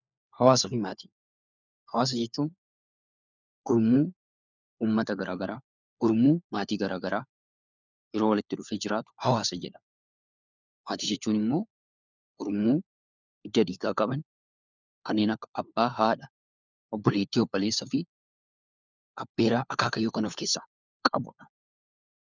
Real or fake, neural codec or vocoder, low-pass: fake; codec, 16 kHz, 4 kbps, FunCodec, trained on LibriTTS, 50 frames a second; 7.2 kHz